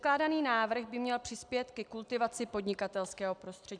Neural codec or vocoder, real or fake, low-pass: none; real; 9.9 kHz